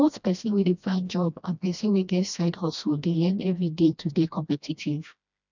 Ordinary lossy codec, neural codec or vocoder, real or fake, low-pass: none; codec, 16 kHz, 1 kbps, FreqCodec, smaller model; fake; 7.2 kHz